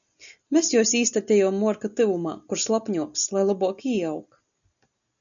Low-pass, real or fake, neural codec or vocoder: 7.2 kHz; real; none